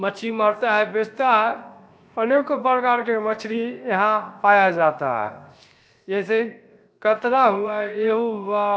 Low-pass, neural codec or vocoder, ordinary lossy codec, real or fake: none; codec, 16 kHz, 0.7 kbps, FocalCodec; none; fake